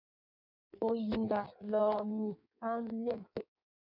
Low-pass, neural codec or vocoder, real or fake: 5.4 kHz; codec, 16 kHz in and 24 kHz out, 1.1 kbps, FireRedTTS-2 codec; fake